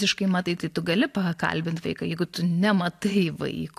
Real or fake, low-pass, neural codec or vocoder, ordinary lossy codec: real; 14.4 kHz; none; AAC, 64 kbps